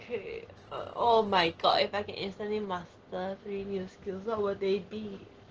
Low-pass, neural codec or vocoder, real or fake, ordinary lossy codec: 7.2 kHz; none; real; Opus, 16 kbps